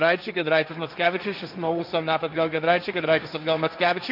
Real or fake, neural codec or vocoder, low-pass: fake; codec, 16 kHz, 1.1 kbps, Voila-Tokenizer; 5.4 kHz